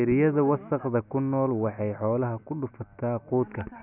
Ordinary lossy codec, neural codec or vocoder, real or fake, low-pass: none; none; real; 3.6 kHz